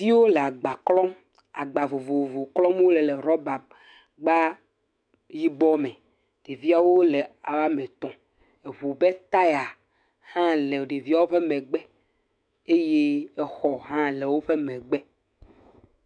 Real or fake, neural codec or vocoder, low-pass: fake; autoencoder, 48 kHz, 128 numbers a frame, DAC-VAE, trained on Japanese speech; 9.9 kHz